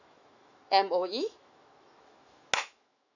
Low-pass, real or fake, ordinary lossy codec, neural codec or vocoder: 7.2 kHz; fake; none; autoencoder, 48 kHz, 128 numbers a frame, DAC-VAE, trained on Japanese speech